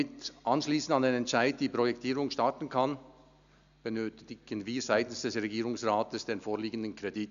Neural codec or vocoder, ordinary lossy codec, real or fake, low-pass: none; none; real; 7.2 kHz